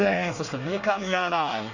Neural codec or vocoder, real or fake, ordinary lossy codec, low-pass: codec, 24 kHz, 1 kbps, SNAC; fake; none; 7.2 kHz